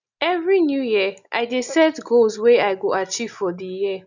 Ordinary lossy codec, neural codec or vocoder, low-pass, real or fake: none; none; 7.2 kHz; real